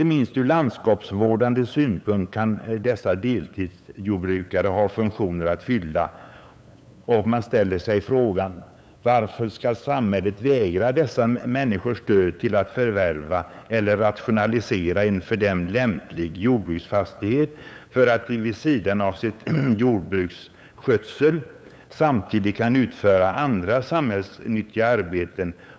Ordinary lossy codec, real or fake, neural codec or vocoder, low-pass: none; fake; codec, 16 kHz, 8 kbps, FunCodec, trained on LibriTTS, 25 frames a second; none